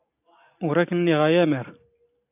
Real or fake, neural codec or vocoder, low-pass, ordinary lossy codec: real; none; 3.6 kHz; AAC, 32 kbps